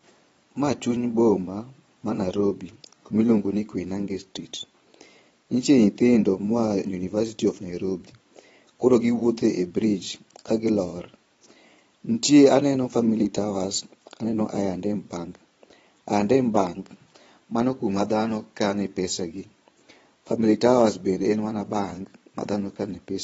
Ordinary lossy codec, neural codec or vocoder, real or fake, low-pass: AAC, 24 kbps; vocoder, 22.05 kHz, 80 mel bands, Vocos; fake; 9.9 kHz